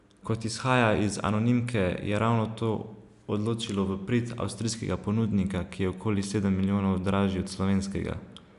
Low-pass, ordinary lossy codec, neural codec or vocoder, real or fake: 10.8 kHz; none; none; real